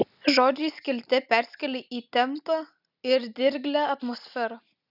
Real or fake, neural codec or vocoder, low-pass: real; none; 5.4 kHz